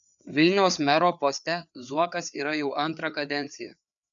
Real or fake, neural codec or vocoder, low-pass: fake; codec, 16 kHz, 4 kbps, FreqCodec, larger model; 7.2 kHz